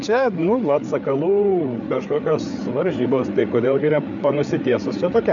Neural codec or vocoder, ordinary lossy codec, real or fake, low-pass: codec, 16 kHz, 8 kbps, FreqCodec, larger model; MP3, 64 kbps; fake; 7.2 kHz